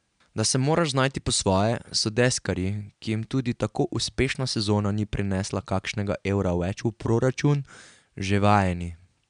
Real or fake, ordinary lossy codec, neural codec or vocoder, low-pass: real; MP3, 96 kbps; none; 9.9 kHz